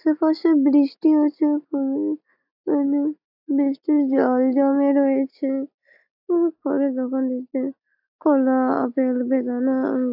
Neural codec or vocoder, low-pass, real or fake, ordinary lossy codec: none; 5.4 kHz; real; AAC, 48 kbps